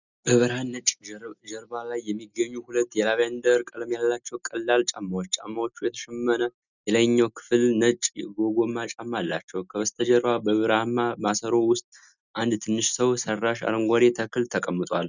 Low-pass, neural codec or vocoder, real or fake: 7.2 kHz; none; real